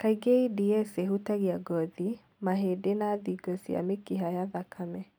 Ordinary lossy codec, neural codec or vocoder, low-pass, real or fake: none; none; none; real